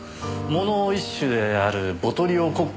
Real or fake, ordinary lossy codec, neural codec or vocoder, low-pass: real; none; none; none